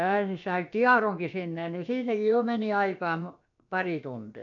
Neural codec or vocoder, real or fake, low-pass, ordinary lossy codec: codec, 16 kHz, about 1 kbps, DyCAST, with the encoder's durations; fake; 7.2 kHz; none